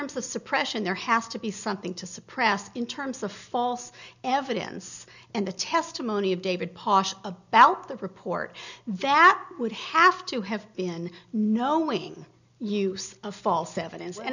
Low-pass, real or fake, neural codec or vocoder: 7.2 kHz; real; none